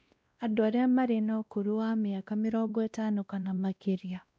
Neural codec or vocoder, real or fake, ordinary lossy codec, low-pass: codec, 16 kHz, 1 kbps, X-Codec, WavLM features, trained on Multilingual LibriSpeech; fake; none; none